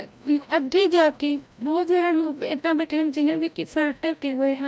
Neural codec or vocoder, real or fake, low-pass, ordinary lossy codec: codec, 16 kHz, 0.5 kbps, FreqCodec, larger model; fake; none; none